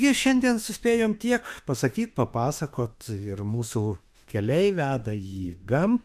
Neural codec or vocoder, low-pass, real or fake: autoencoder, 48 kHz, 32 numbers a frame, DAC-VAE, trained on Japanese speech; 14.4 kHz; fake